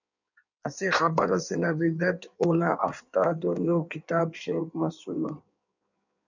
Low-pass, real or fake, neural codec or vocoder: 7.2 kHz; fake; codec, 16 kHz in and 24 kHz out, 1.1 kbps, FireRedTTS-2 codec